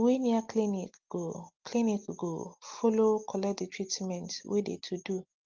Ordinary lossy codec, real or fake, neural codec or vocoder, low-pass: Opus, 32 kbps; real; none; 7.2 kHz